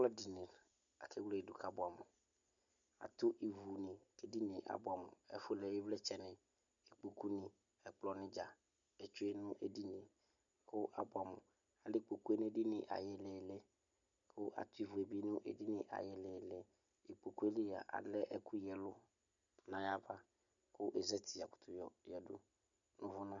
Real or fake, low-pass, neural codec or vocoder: real; 7.2 kHz; none